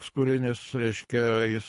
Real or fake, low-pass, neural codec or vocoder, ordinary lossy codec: fake; 10.8 kHz; codec, 24 kHz, 3 kbps, HILCodec; MP3, 48 kbps